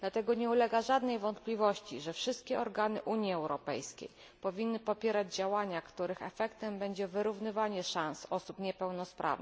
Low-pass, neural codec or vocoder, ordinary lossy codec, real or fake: none; none; none; real